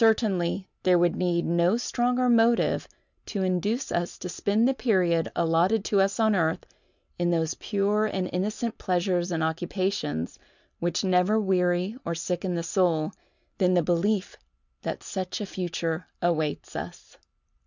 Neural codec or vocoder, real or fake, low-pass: none; real; 7.2 kHz